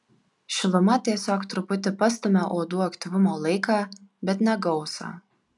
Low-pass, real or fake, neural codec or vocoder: 10.8 kHz; real; none